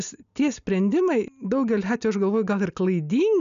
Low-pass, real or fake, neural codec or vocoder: 7.2 kHz; real; none